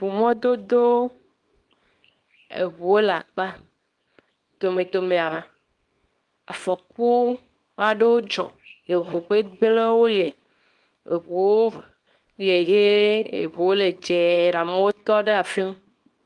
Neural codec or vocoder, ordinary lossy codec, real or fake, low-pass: codec, 24 kHz, 0.9 kbps, WavTokenizer, small release; Opus, 32 kbps; fake; 10.8 kHz